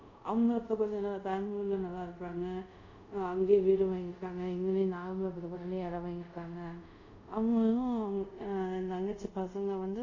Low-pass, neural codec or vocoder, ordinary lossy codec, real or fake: 7.2 kHz; codec, 24 kHz, 0.5 kbps, DualCodec; none; fake